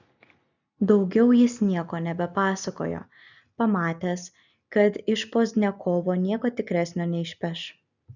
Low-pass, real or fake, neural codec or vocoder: 7.2 kHz; real; none